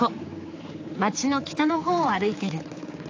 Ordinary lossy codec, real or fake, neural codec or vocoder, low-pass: AAC, 48 kbps; fake; codec, 16 kHz, 4 kbps, X-Codec, HuBERT features, trained on general audio; 7.2 kHz